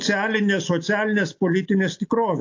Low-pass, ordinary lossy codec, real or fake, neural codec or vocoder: 7.2 kHz; AAC, 48 kbps; real; none